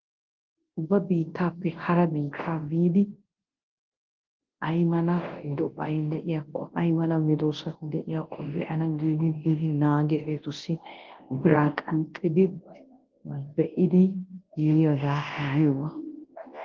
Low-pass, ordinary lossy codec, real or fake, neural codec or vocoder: 7.2 kHz; Opus, 16 kbps; fake; codec, 24 kHz, 0.9 kbps, WavTokenizer, large speech release